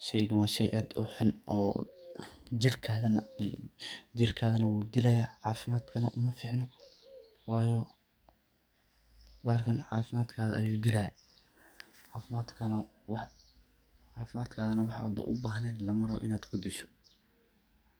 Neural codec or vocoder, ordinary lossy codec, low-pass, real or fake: codec, 44.1 kHz, 2.6 kbps, SNAC; none; none; fake